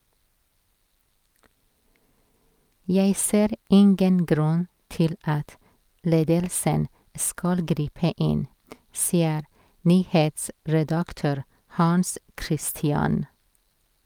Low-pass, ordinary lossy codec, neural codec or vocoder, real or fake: 19.8 kHz; Opus, 32 kbps; none; real